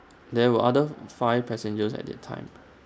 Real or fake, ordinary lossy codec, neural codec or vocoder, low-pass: real; none; none; none